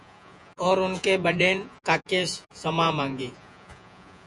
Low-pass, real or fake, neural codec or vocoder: 10.8 kHz; fake; vocoder, 48 kHz, 128 mel bands, Vocos